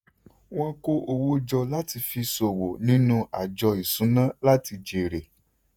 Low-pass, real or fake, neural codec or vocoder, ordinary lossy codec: none; fake; vocoder, 48 kHz, 128 mel bands, Vocos; none